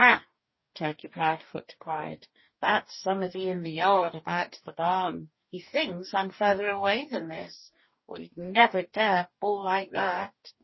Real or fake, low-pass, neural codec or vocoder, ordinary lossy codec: fake; 7.2 kHz; codec, 44.1 kHz, 2.6 kbps, DAC; MP3, 24 kbps